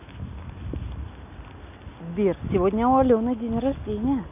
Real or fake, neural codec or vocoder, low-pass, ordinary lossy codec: real; none; 3.6 kHz; none